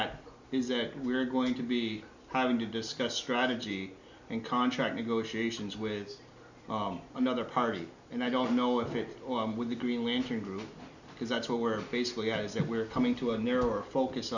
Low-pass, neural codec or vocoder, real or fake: 7.2 kHz; none; real